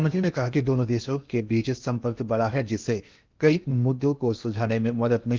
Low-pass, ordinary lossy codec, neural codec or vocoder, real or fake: 7.2 kHz; Opus, 24 kbps; codec, 16 kHz in and 24 kHz out, 0.8 kbps, FocalCodec, streaming, 65536 codes; fake